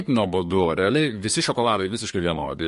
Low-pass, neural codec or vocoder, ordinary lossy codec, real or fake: 10.8 kHz; codec, 24 kHz, 1 kbps, SNAC; MP3, 48 kbps; fake